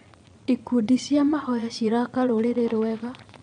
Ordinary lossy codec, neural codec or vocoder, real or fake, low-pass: none; vocoder, 22.05 kHz, 80 mel bands, Vocos; fake; 9.9 kHz